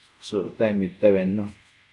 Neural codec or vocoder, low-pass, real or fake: codec, 24 kHz, 0.5 kbps, DualCodec; 10.8 kHz; fake